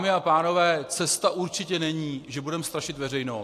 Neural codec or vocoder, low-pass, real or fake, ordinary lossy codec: none; 14.4 kHz; real; AAC, 64 kbps